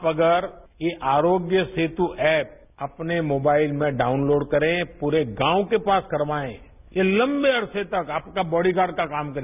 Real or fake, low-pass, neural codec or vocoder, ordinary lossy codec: real; 3.6 kHz; none; none